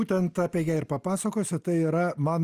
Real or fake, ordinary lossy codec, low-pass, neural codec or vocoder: real; Opus, 32 kbps; 14.4 kHz; none